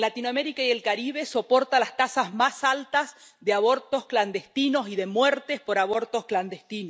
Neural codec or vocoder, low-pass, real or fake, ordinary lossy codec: none; none; real; none